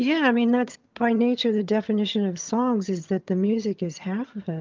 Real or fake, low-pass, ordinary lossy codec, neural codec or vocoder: fake; 7.2 kHz; Opus, 24 kbps; vocoder, 22.05 kHz, 80 mel bands, HiFi-GAN